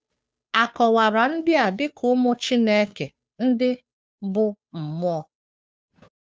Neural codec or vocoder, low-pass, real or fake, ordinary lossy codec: codec, 16 kHz, 2 kbps, FunCodec, trained on Chinese and English, 25 frames a second; none; fake; none